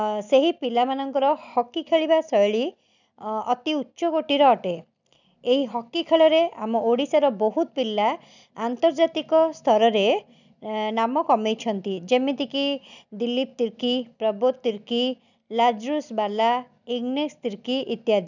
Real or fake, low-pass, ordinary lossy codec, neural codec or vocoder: real; 7.2 kHz; none; none